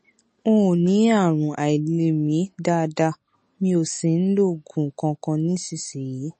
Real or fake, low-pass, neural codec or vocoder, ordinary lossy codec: real; 10.8 kHz; none; MP3, 32 kbps